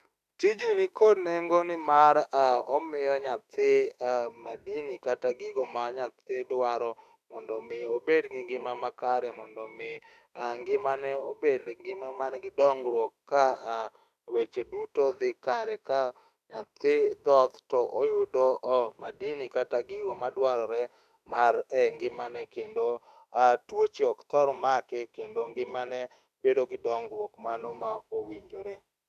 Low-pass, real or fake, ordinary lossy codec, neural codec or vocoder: 14.4 kHz; fake; none; autoencoder, 48 kHz, 32 numbers a frame, DAC-VAE, trained on Japanese speech